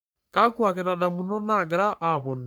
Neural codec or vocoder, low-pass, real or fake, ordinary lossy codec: codec, 44.1 kHz, 3.4 kbps, Pupu-Codec; none; fake; none